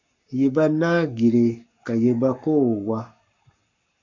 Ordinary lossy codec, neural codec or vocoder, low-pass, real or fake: MP3, 48 kbps; codec, 44.1 kHz, 7.8 kbps, Pupu-Codec; 7.2 kHz; fake